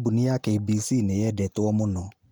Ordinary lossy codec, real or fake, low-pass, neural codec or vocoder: none; real; none; none